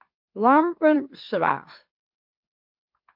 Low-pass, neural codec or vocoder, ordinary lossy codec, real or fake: 5.4 kHz; autoencoder, 44.1 kHz, a latent of 192 numbers a frame, MeloTTS; MP3, 48 kbps; fake